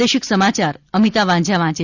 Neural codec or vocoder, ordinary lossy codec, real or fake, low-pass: none; Opus, 64 kbps; real; 7.2 kHz